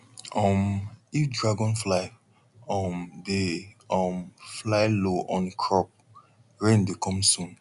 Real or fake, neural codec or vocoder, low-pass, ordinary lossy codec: real; none; 10.8 kHz; none